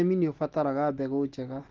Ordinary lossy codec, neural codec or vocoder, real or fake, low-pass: Opus, 16 kbps; none; real; 7.2 kHz